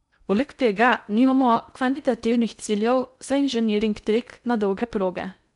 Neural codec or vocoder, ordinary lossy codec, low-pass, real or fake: codec, 16 kHz in and 24 kHz out, 0.6 kbps, FocalCodec, streaming, 2048 codes; none; 10.8 kHz; fake